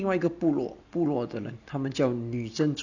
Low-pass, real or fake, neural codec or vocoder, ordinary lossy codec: 7.2 kHz; real; none; none